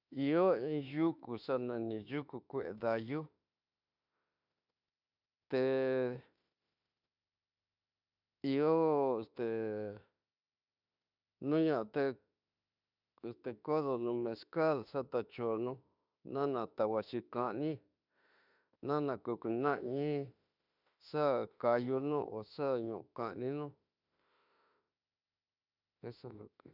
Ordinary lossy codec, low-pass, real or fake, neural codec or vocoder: none; 5.4 kHz; fake; autoencoder, 48 kHz, 32 numbers a frame, DAC-VAE, trained on Japanese speech